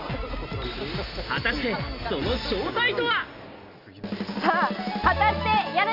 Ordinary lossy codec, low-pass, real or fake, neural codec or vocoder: none; 5.4 kHz; real; none